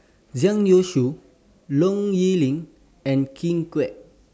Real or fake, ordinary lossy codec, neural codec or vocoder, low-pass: real; none; none; none